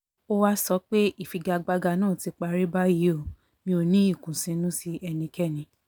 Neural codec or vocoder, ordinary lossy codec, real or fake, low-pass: none; none; real; none